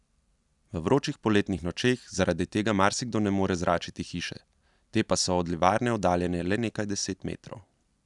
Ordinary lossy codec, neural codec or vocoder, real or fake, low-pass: none; none; real; 10.8 kHz